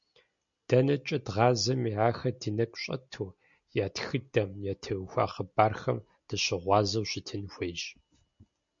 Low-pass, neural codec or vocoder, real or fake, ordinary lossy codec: 7.2 kHz; none; real; MP3, 64 kbps